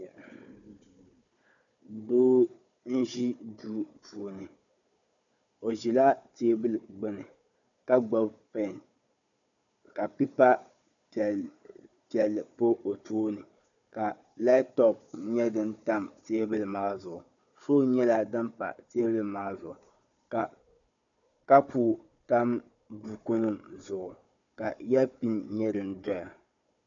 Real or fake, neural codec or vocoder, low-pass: fake; codec, 16 kHz, 4 kbps, FunCodec, trained on Chinese and English, 50 frames a second; 7.2 kHz